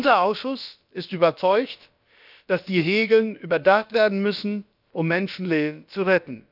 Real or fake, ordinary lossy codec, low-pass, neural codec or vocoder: fake; none; 5.4 kHz; codec, 16 kHz, about 1 kbps, DyCAST, with the encoder's durations